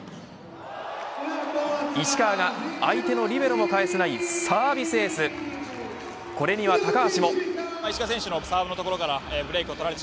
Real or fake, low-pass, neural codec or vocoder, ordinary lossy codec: real; none; none; none